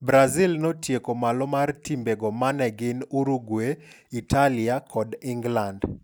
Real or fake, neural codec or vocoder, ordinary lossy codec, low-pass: real; none; none; none